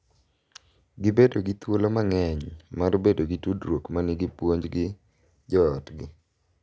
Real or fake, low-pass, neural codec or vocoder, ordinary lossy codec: real; none; none; none